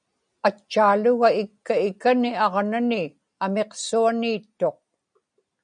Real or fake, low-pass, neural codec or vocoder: real; 9.9 kHz; none